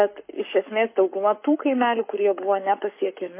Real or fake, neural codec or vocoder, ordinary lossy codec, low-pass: fake; codec, 44.1 kHz, 7.8 kbps, Pupu-Codec; MP3, 24 kbps; 3.6 kHz